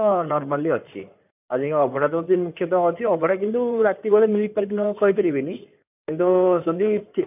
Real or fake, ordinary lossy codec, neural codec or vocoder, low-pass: fake; none; codec, 16 kHz in and 24 kHz out, 2.2 kbps, FireRedTTS-2 codec; 3.6 kHz